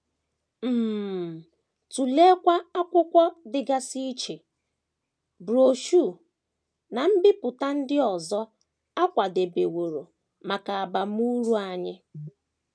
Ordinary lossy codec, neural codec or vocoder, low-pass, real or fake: none; none; none; real